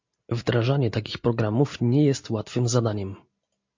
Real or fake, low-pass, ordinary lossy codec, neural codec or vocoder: real; 7.2 kHz; MP3, 48 kbps; none